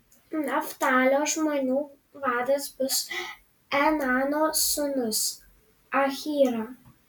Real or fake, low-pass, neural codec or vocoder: real; 19.8 kHz; none